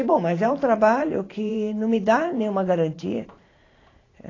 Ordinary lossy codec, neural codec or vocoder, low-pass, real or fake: AAC, 32 kbps; vocoder, 44.1 kHz, 128 mel bands every 512 samples, BigVGAN v2; 7.2 kHz; fake